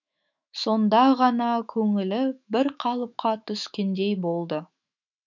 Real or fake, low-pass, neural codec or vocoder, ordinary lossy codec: fake; 7.2 kHz; autoencoder, 48 kHz, 128 numbers a frame, DAC-VAE, trained on Japanese speech; none